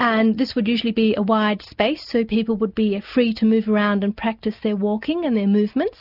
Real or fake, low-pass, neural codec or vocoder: real; 5.4 kHz; none